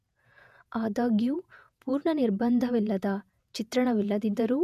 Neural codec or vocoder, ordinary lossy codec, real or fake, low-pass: vocoder, 48 kHz, 128 mel bands, Vocos; none; fake; 14.4 kHz